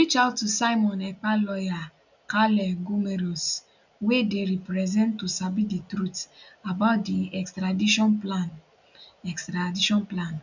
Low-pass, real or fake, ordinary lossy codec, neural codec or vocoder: 7.2 kHz; real; none; none